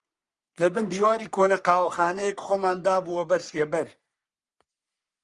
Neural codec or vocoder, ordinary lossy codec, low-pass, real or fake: codec, 44.1 kHz, 3.4 kbps, Pupu-Codec; Opus, 32 kbps; 10.8 kHz; fake